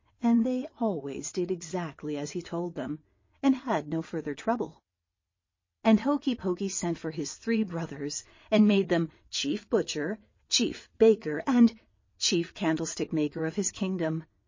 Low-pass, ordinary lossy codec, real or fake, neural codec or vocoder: 7.2 kHz; MP3, 32 kbps; fake; vocoder, 22.05 kHz, 80 mel bands, WaveNeXt